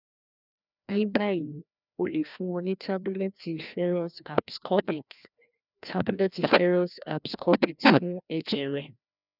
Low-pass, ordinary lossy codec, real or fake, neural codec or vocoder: 5.4 kHz; none; fake; codec, 16 kHz, 1 kbps, FreqCodec, larger model